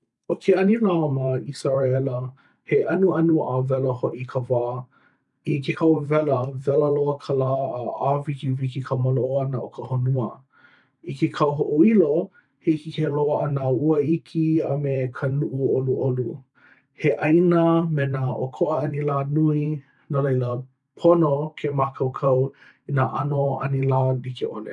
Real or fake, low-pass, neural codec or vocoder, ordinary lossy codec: fake; 10.8 kHz; vocoder, 44.1 kHz, 128 mel bands every 512 samples, BigVGAN v2; none